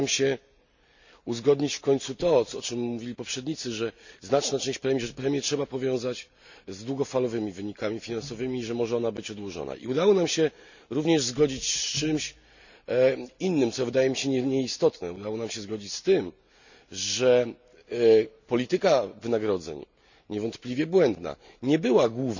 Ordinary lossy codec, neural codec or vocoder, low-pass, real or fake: none; none; 7.2 kHz; real